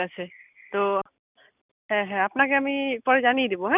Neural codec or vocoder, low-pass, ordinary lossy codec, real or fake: none; 3.6 kHz; none; real